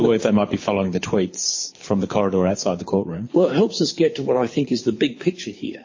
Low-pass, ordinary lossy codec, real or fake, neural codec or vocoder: 7.2 kHz; MP3, 32 kbps; fake; codec, 24 kHz, 6 kbps, HILCodec